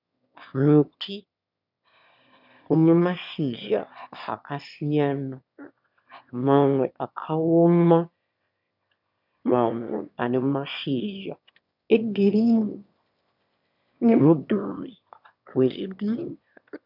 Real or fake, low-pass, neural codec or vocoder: fake; 5.4 kHz; autoencoder, 22.05 kHz, a latent of 192 numbers a frame, VITS, trained on one speaker